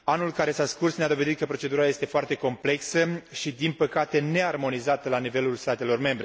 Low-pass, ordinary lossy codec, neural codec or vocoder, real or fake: none; none; none; real